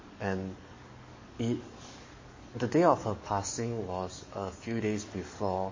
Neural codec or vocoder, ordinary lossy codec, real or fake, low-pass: codec, 44.1 kHz, 7.8 kbps, DAC; MP3, 32 kbps; fake; 7.2 kHz